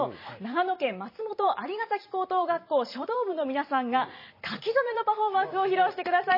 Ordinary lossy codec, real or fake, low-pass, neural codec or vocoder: MP3, 24 kbps; fake; 5.4 kHz; vocoder, 44.1 kHz, 128 mel bands every 512 samples, BigVGAN v2